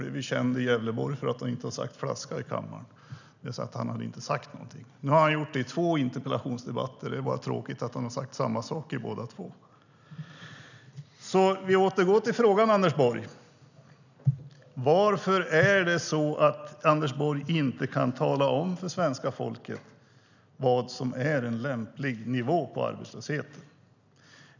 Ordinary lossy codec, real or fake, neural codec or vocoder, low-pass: none; real; none; 7.2 kHz